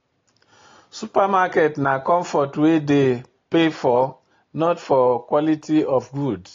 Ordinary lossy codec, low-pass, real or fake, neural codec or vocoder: AAC, 32 kbps; 7.2 kHz; real; none